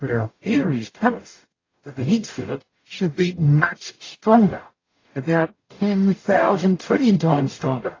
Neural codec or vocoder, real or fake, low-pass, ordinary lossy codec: codec, 44.1 kHz, 0.9 kbps, DAC; fake; 7.2 kHz; AAC, 32 kbps